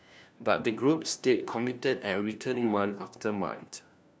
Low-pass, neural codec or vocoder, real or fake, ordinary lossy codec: none; codec, 16 kHz, 1 kbps, FunCodec, trained on LibriTTS, 50 frames a second; fake; none